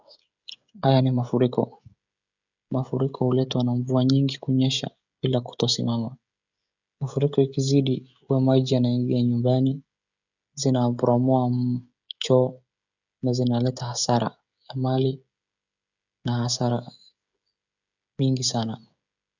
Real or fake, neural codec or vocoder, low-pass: fake; codec, 16 kHz, 16 kbps, FreqCodec, smaller model; 7.2 kHz